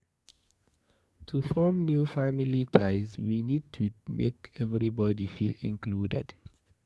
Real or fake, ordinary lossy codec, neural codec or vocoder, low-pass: fake; none; codec, 24 kHz, 1 kbps, SNAC; none